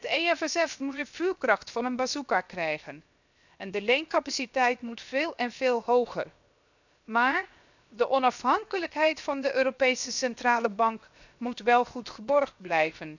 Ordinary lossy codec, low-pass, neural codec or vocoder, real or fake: none; 7.2 kHz; codec, 16 kHz, 0.7 kbps, FocalCodec; fake